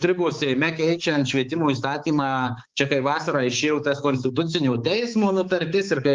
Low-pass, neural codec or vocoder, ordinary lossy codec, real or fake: 7.2 kHz; codec, 16 kHz, 4 kbps, X-Codec, HuBERT features, trained on balanced general audio; Opus, 32 kbps; fake